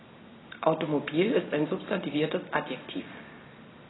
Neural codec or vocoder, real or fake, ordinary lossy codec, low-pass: none; real; AAC, 16 kbps; 7.2 kHz